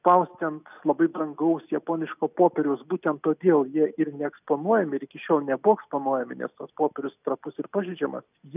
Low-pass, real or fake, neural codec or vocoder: 3.6 kHz; real; none